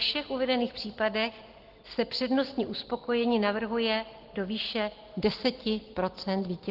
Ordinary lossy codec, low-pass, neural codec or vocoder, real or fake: Opus, 16 kbps; 5.4 kHz; none; real